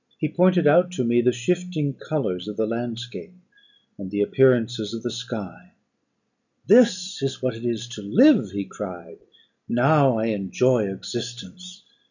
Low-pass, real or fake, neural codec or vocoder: 7.2 kHz; real; none